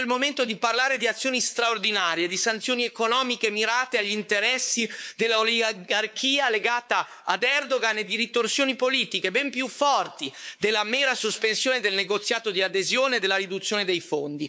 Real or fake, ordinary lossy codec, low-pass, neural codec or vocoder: fake; none; none; codec, 16 kHz, 4 kbps, X-Codec, WavLM features, trained on Multilingual LibriSpeech